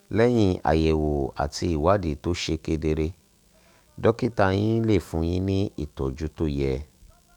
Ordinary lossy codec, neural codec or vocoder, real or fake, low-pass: none; autoencoder, 48 kHz, 128 numbers a frame, DAC-VAE, trained on Japanese speech; fake; 19.8 kHz